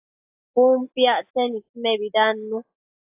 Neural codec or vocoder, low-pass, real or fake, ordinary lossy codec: none; 3.6 kHz; real; AAC, 32 kbps